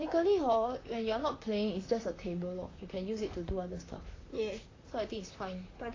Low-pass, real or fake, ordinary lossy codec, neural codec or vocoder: 7.2 kHz; fake; AAC, 32 kbps; vocoder, 44.1 kHz, 128 mel bands, Pupu-Vocoder